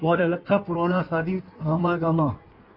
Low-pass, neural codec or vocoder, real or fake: 5.4 kHz; codec, 16 kHz in and 24 kHz out, 1.1 kbps, FireRedTTS-2 codec; fake